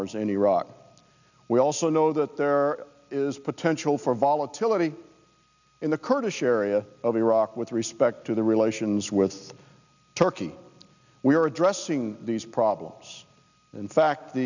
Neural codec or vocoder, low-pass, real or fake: none; 7.2 kHz; real